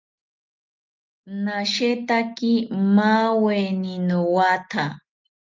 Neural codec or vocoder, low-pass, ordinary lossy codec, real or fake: none; 7.2 kHz; Opus, 24 kbps; real